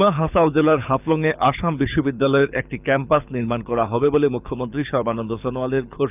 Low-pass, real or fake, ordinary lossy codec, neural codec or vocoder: 3.6 kHz; fake; none; codec, 24 kHz, 6 kbps, HILCodec